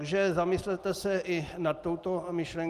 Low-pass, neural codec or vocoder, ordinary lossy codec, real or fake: 14.4 kHz; none; Opus, 32 kbps; real